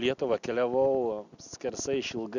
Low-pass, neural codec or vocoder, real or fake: 7.2 kHz; none; real